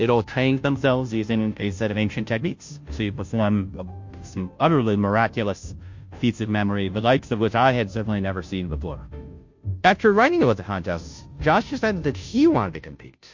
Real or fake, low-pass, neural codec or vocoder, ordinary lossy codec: fake; 7.2 kHz; codec, 16 kHz, 0.5 kbps, FunCodec, trained on Chinese and English, 25 frames a second; MP3, 48 kbps